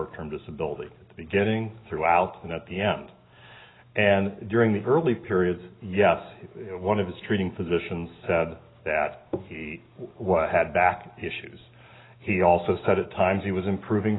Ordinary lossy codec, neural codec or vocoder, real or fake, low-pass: AAC, 16 kbps; none; real; 7.2 kHz